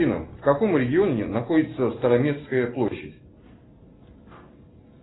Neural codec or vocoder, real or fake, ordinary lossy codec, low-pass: none; real; AAC, 16 kbps; 7.2 kHz